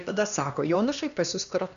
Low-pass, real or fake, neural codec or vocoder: 7.2 kHz; fake; codec, 16 kHz, 2 kbps, X-Codec, HuBERT features, trained on LibriSpeech